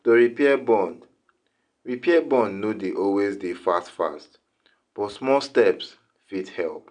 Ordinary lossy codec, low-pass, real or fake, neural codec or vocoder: none; 9.9 kHz; real; none